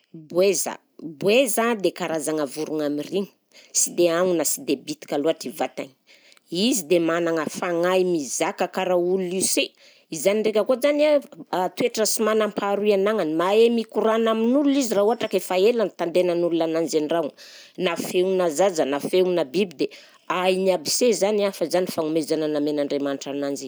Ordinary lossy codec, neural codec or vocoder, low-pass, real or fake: none; none; none; real